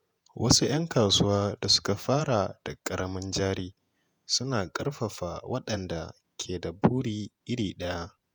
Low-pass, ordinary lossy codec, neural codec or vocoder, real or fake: none; none; vocoder, 48 kHz, 128 mel bands, Vocos; fake